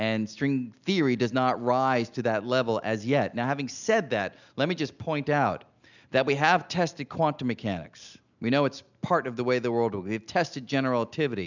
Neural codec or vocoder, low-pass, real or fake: none; 7.2 kHz; real